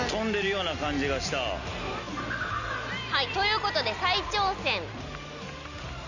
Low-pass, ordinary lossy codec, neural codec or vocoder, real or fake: 7.2 kHz; none; none; real